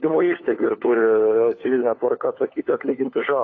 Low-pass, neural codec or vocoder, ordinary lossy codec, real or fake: 7.2 kHz; codec, 16 kHz, 4 kbps, FunCodec, trained on LibriTTS, 50 frames a second; AAC, 32 kbps; fake